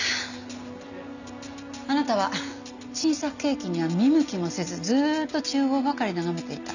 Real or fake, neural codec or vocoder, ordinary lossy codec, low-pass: real; none; none; 7.2 kHz